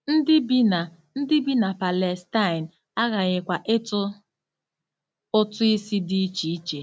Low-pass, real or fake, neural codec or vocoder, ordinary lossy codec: none; real; none; none